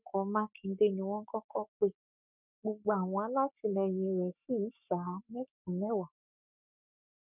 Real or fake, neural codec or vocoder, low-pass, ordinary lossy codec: fake; codec, 44.1 kHz, 7.8 kbps, Pupu-Codec; 3.6 kHz; none